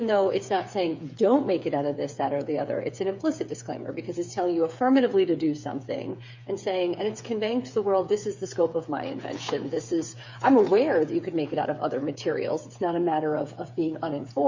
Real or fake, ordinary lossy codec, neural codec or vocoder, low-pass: fake; MP3, 64 kbps; codec, 16 kHz, 8 kbps, FreqCodec, smaller model; 7.2 kHz